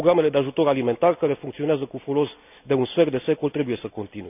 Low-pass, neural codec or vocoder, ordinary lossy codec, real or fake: 3.6 kHz; none; none; real